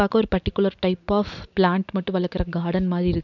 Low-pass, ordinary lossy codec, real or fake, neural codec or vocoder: 7.2 kHz; none; real; none